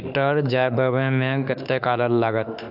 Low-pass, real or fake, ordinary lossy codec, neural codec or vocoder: 5.4 kHz; fake; AAC, 48 kbps; autoencoder, 48 kHz, 32 numbers a frame, DAC-VAE, trained on Japanese speech